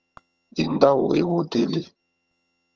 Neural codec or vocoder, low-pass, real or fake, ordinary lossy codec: vocoder, 22.05 kHz, 80 mel bands, HiFi-GAN; 7.2 kHz; fake; Opus, 24 kbps